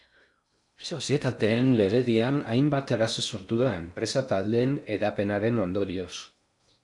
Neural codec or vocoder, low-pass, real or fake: codec, 16 kHz in and 24 kHz out, 0.6 kbps, FocalCodec, streaming, 4096 codes; 10.8 kHz; fake